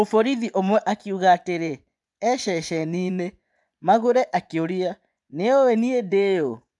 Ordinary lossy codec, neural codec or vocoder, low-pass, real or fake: none; vocoder, 24 kHz, 100 mel bands, Vocos; 10.8 kHz; fake